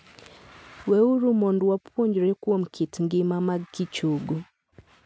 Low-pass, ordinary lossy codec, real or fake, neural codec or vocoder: none; none; real; none